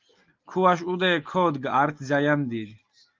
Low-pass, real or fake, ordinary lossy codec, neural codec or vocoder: 7.2 kHz; real; Opus, 32 kbps; none